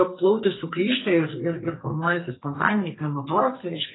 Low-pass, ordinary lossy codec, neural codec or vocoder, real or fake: 7.2 kHz; AAC, 16 kbps; codec, 44.1 kHz, 2.6 kbps, SNAC; fake